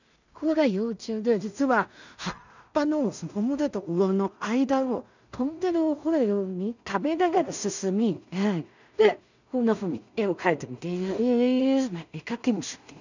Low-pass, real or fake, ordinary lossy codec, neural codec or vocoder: 7.2 kHz; fake; none; codec, 16 kHz in and 24 kHz out, 0.4 kbps, LongCat-Audio-Codec, two codebook decoder